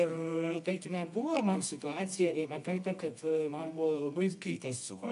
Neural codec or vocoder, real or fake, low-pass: codec, 24 kHz, 0.9 kbps, WavTokenizer, medium music audio release; fake; 10.8 kHz